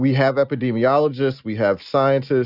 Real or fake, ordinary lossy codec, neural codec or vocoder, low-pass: real; Opus, 64 kbps; none; 5.4 kHz